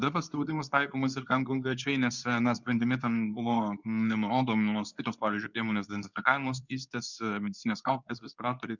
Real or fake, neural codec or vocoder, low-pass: fake; codec, 24 kHz, 0.9 kbps, WavTokenizer, medium speech release version 2; 7.2 kHz